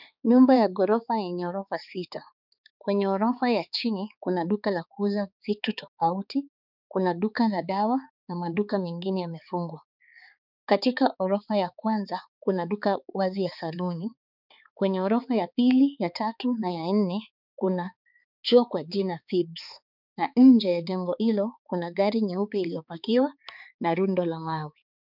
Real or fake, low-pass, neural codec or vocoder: fake; 5.4 kHz; codec, 16 kHz, 4 kbps, X-Codec, HuBERT features, trained on balanced general audio